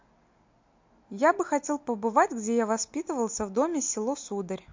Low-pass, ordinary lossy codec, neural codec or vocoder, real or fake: 7.2 kHz; MP3, 48 kbps; none; real